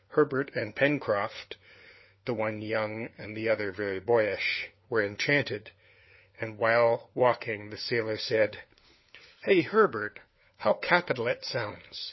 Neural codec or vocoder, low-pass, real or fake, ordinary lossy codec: codec, 16 kHz, 4 kbps, FunCodec, trained on LibriTTS, 50 frames a second; 7.2 kHz; fake; MP3, 24 kbps